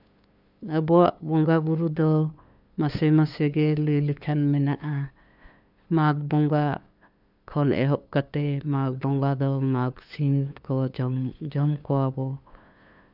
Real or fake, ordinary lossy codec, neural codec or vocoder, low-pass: fake; none; codec, 16 kHz, 2 kbps, FunCodec, trained on LibriTTS, 25 frames a second; 5.4 kHz